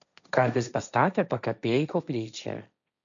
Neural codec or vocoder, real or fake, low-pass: codec, 16 kHz, 1.1 kbps, Voila-Tokenizer; fake; 7.2 kHz